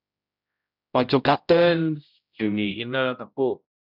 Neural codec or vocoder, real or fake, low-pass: codec, 16 kHz, 0.5 kbps, X-Codec, HuBERT features, trained on general audio; fake; 5.4 kHz